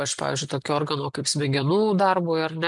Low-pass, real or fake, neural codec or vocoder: 10.8 kHz; real; none